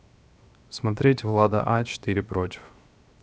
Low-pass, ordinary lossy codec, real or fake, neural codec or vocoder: none; none; fake; codec, 16 kHz, 0.7 kbps, FocalCodec